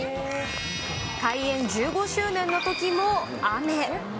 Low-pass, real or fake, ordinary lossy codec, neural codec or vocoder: none; real; none; none